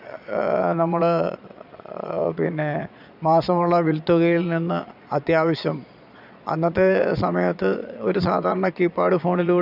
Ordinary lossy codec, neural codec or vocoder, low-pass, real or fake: none; vocoder, 22.05 kHz, 80 mel bands, Vocos; 5.4 kHz; fake